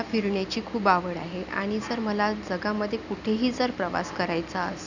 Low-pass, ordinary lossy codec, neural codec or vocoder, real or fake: 7.2 kHz; none; none; real